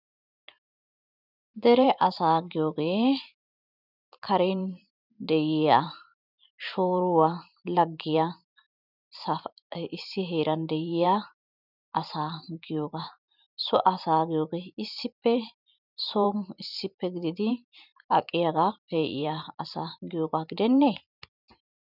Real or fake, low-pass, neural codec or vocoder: fake; 5.4 kHz; vocoder, 44.1 kHz, 128 mel bands every 512 samples, BigVGAN v2